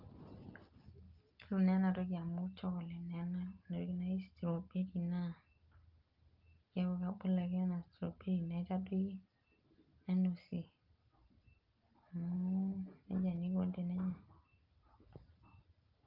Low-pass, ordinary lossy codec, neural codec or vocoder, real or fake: 5.4 kHz; Opus, 32 kbps; none; real